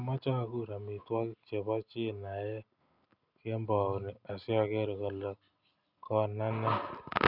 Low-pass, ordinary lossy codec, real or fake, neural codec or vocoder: 5.4 kHz; none; real; none